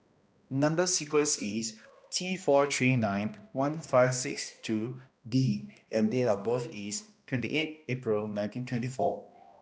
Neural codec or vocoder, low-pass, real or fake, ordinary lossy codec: codec, 16 kHz, 1 kbps, X-Codec, HuBERT features, trained on balanced general audio; none; fake; none